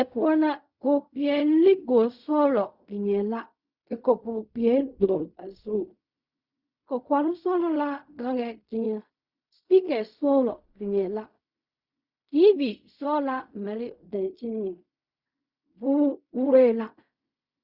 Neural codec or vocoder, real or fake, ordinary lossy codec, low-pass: codec, 16 kHz in and 24 kHz out, 0.4 kbps, LongCat-Audio-Codec, fine tuned four codebook decoder; fake; Opus, 64 kbps; 5.4 kHz